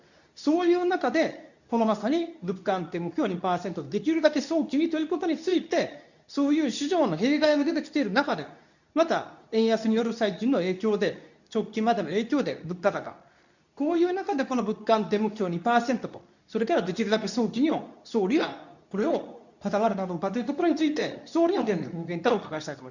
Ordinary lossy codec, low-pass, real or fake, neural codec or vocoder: none; 7.2 kHz; fake; codec, 24 kHz, 0.9 kbps, WavTokenizer, medium speech release version 2